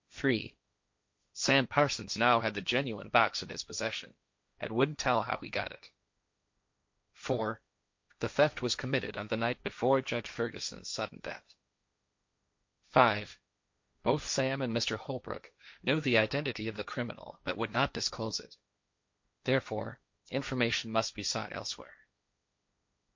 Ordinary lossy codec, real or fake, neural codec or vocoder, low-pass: MP3, 48 kbps; fake; codec, 16 kHz, 1.1 kbps, Voila-Tokenizer; 7.2 kHz